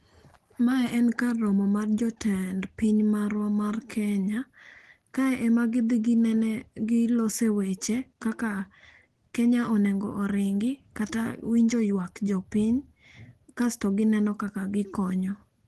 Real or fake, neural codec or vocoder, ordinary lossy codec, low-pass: real; none; Opus, 16 kbps; 10.8 kHz